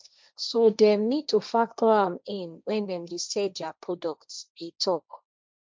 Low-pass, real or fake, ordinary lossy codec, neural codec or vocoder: none; fake; none; codec, 16 kHz, 1.1 kbps, Voila-Tokenizer